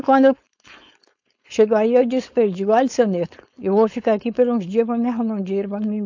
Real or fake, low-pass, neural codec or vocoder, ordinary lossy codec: fake; 7.2 kHz; codec, 16 kHz, 4.8 kbps, FACodec; AAC, 48 kbps